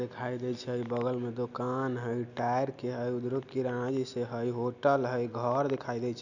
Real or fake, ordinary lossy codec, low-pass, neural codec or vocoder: real; none; 7.2 kHz; none